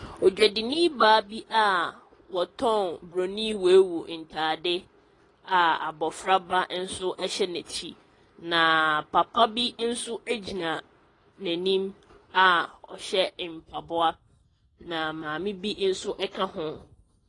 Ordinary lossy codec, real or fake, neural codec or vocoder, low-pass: AAC, 32 kbps; real; none; 10.8 kHz